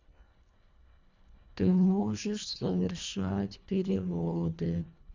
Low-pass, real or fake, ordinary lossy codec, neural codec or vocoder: 7.2 kHz; fake; MP3, 64 kbps; codec, 24 kHz, 1.5 kbps, HILCodec